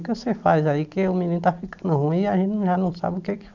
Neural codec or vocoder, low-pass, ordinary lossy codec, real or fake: none; 7.2 kHz; none; real